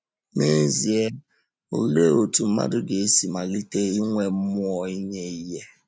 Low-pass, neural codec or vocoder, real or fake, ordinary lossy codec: none; none; real; none